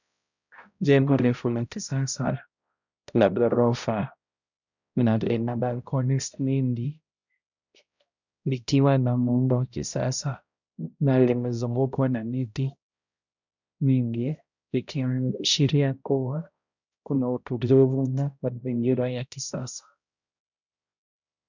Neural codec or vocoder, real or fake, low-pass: codec, 16 kHz, 0.5 kbps, X-Codec, HuBERT features, trained on balanced general audio; fake; 7.2 kHz